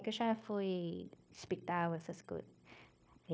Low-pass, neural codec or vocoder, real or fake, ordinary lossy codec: none; codec, 16 kHz, 0.9 kbps, LongCat-Audio-Codec; fake; none